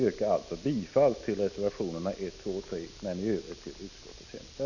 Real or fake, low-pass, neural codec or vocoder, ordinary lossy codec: real; 7.2 kHz; none; none